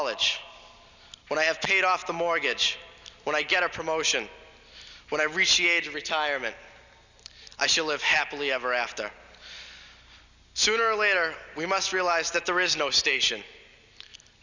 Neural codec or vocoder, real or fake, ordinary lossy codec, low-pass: none; real; Opus, 64 kbps; 7.2 kHz